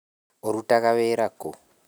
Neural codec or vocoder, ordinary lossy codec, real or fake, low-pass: none; none; real; none